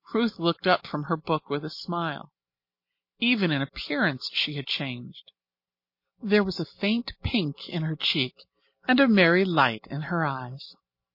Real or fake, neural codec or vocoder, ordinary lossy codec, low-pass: real; none; MP3, 32 kbps; 5.4 kHz